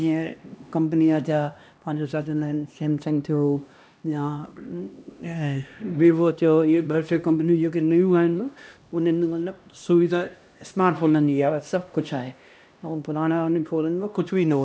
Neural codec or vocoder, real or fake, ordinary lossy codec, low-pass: codec, 16 kHz, 1 kbps, X-Codec, HuBERT features, trained on LibriSpeech; fake; none; none